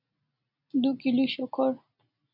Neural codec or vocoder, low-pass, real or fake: none; 5.4 kHz; real